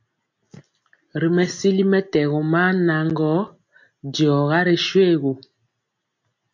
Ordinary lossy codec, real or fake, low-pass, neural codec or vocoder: MP3, 48 kbps; real; 7.2 kHz; none